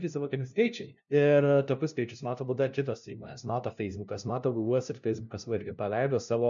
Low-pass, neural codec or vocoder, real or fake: 7.2 kHz; codec, 16 kHz, 0.5 kbps, FunCodec, trained on LibriTTS, 25 frames a second; fake